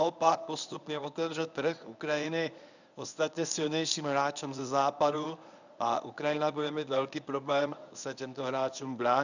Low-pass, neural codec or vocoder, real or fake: 7.2 kHz; codec, 24 kHz, 0.9 kbps, WavTokenizer, medium speech release version 1; fake